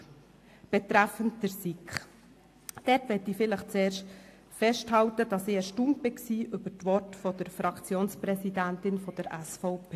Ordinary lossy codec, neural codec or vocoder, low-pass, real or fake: AAC, 64 kbps; none; 14.4 kHz; real